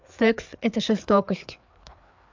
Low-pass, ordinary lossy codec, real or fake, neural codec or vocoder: 7.2 kHz; MP3, 64 kbps; fake; codec, 16 kHz, 4 kbps, FreqCodec, larger model